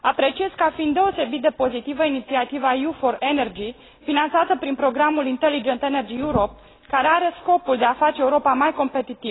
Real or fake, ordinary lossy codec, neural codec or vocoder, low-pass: real; AAC, 16 kbps; none; 7.2 kHz